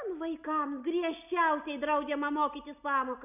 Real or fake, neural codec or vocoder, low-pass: real; none; 3.6 kHz